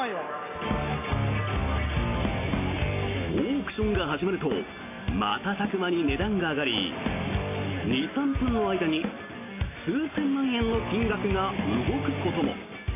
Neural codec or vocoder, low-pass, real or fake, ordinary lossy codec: none; 3.6 kHz; real; MP3, 24 kbps